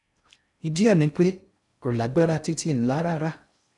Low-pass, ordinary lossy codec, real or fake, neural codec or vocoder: 10.8 kHz; none; fake; codec, 16 kHz in and 24 kHz out, 0.6 kbps, FocalCodec, streaming, 2048 codes